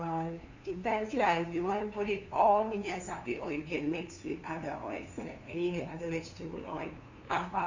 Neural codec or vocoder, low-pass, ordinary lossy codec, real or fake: codec, 16 kHz, 2 kbps, FunCodec, trained on LibriTTS, 25 frames a second; 7.2 kHz; Opus, 64 kbps; fake